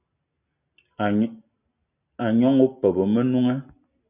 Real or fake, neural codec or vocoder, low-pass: real; none; 3.6 kHz